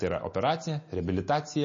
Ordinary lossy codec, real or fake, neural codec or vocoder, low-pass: MP3, 32 kbps; real; none; 7.2 kHz